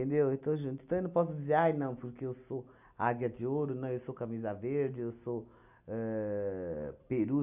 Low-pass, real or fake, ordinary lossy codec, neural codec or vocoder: 3.6 kHz; real; none; none